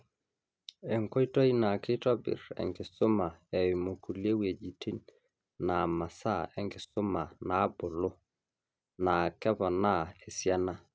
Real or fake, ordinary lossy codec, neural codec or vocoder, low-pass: real; none; none; none